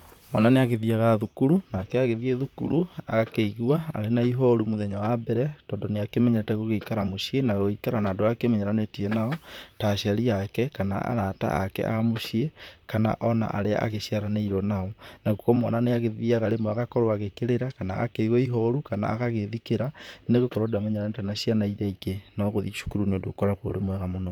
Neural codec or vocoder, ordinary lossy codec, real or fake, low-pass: vocoder, 44.1 kHz, 128 mel bands, Pupu-Vocoder; none; fake; 19.8 kHz